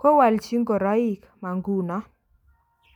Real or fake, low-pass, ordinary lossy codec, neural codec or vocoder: real; 19.8 kHz; none; none